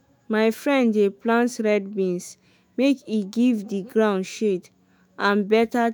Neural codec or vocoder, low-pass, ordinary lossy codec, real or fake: autoencoder, 48 kHz, 128 numbers a frame, DAC-VAE, trained on Japanese speech; none; none; fake